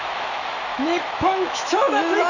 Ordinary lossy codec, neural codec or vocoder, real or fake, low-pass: none; autoencoder, 48 kHz, 128 numbers a frame, DAC-VAE, trained on Japanese speech; fake; 7.2 kHz